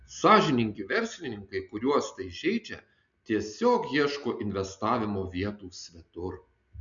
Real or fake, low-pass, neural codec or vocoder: real; 7.2 kHz; none